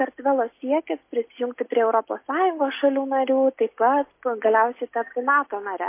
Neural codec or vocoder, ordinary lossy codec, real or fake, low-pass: none; MP3, 24 kbps; real; 3.6 kHz